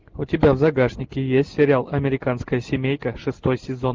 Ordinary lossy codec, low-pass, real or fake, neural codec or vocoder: Opus, 32 kbps; 7.2 kHz; real; none